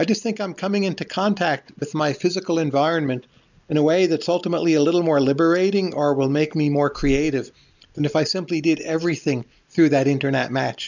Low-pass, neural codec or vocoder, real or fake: 7.2 kHz; none; real